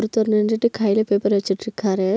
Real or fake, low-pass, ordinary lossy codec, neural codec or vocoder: real; none; none; none